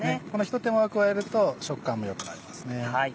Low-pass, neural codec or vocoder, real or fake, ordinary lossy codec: none; none; real; none